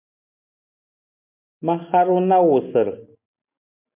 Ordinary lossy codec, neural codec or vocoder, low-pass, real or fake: MP3, 32 kbps; none; 3.6 kHz; real